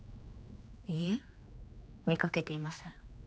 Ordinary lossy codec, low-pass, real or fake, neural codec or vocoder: none; none; fake; codec, 16 kHz, 2 kbps, X-Codec, HuBERT features, trained on general audio